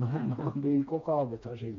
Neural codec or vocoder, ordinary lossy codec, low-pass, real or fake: codec, 16 kHz, 2 kbps, FreqCodec, smaller model; MP3, 48 kbps; 7.2 kHz; fake